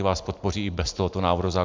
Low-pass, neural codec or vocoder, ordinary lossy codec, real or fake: 7.2 kHz; none; MP3, 64 kbps; real